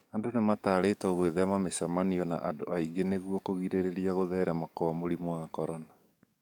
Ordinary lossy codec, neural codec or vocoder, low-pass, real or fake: none; codec, 44.1 kHz, 7.8 kbps, DAC; 19.8 kHz; fake